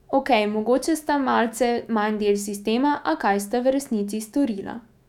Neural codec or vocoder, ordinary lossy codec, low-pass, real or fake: autoencoder, 48 kHz, 128 numbers a frame, DAC-VAE, trained on Japanese speech; none; 19.8 kHz; fake